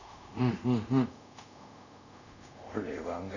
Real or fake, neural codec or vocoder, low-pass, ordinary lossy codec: fake; codec, 24 kHz, 0.5 kbps, DualCodec; 7.2 kHz; none